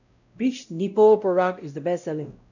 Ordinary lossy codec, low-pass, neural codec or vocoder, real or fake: none; 7.2 kHz; codec, 16 kHz, 0.5 kbps, X-Codec, WavLM features, trained on Multilingual LibriSpeech; fake